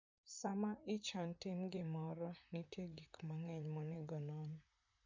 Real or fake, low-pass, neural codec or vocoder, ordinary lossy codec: fake; 7.2 kHz; vocoder, 44.1 kHz, 128 mel bands, Pupu-Vocoder; MP3, 64 kbps